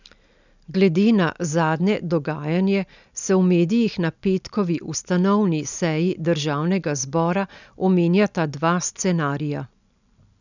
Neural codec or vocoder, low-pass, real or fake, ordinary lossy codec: none; 7.2 kHz; real; none